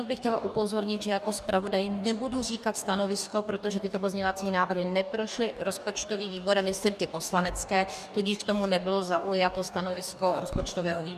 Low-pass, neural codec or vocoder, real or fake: 14.4 kHz; codec, 44.1 kHz, 2.6 kbps, DAC; fake